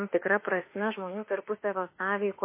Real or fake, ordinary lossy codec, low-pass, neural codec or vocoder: fake; MP3, 24 kbps; 3.6 kHz; autoencoder, 48 kHz, 32 numbers a frame, DAC-VAE, trained on Japanese speech